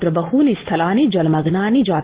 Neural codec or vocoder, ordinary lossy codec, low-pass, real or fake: none; Opus, 16 kbps; 3.6 kHz; real